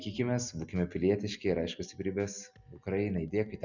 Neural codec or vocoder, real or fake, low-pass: none; real; 7.2 kHz